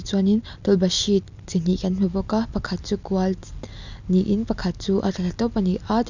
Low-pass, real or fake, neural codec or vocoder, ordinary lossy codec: 7.2 kHz; real; none; none